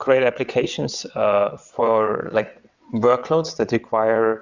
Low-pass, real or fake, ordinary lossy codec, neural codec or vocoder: 7.2 kHz; fake; Opus, 64 kbps; vocoder, 22.05 kHz, 80 mel bands, WaveNeXt